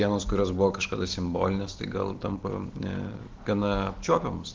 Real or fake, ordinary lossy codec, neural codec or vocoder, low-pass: real; Opus, 16 kbps; none; 7.2 kHz